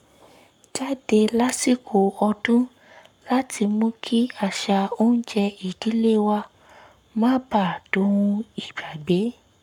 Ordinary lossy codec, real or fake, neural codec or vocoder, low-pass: none; fake; codec, 44.1 kHz, 7.8 kbps, Pupu-Codec; 19.8 kHz